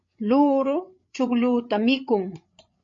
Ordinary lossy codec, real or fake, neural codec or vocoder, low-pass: MP3, 48 kbps; fake; codec, 16 kHz, 8 kbps, FreqCodec, larger model; 7.2 kHz